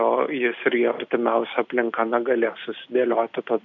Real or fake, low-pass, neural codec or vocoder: real; 7.2 kHz; none